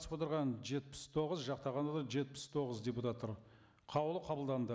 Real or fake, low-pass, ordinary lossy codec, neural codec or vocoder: real; none; none; none